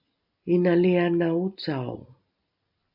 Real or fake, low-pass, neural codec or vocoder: real; 5.4 kHz; none